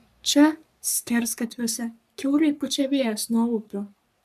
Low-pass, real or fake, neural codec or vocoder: 14.4 kHz; fake; codec, 44.1 kHz, 3.4 kbps, Pupu-Codec